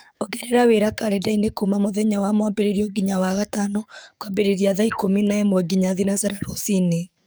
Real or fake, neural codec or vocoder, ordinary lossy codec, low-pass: fake; codec, 44.1 kHz, 7.8 kbps, DAC; none; none